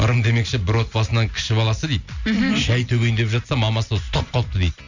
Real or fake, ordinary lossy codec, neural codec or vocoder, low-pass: real; none; none; 7.2 kHz